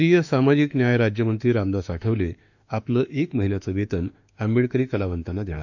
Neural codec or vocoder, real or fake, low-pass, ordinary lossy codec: autoencoder, 48 kHz, 32 numbers a frame, DAC-VAE, trained on Japanese speech; fake; 7.2 kHz; none